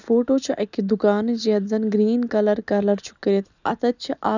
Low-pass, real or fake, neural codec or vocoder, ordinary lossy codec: 7.2 kHz; real; none; none